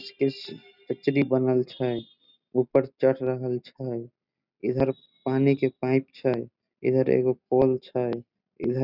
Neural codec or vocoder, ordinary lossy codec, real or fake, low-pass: none; none; real; 5.4 kHz